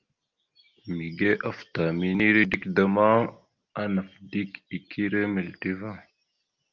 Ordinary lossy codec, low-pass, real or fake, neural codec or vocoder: Opus, 24 kbps; 7.2 kHz; real; none